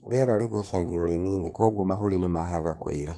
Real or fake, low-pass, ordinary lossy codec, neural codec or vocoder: fake; none; none; codec, 24 kHz, 1 kbps, SNAC